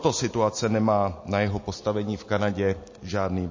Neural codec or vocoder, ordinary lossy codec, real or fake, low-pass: none; MP3, 32 kbps; real; 7.2 kHz